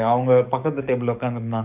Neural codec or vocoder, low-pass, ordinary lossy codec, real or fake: codec, 44.1 kHz, 7.8 kbps, DAC; 3.6 kHz; none; fake